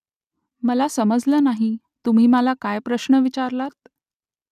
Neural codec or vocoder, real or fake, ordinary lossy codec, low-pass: none; real; none; 14.4 kHz